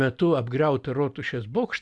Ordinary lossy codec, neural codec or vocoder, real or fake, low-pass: Opus, 64 kbps; none; real; 10.8 kHz